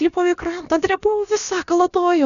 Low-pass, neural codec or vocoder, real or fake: 7.2 kHz; codec, 16 kHz, about 1 kbps, DyCAST, with the encoder's durations; fake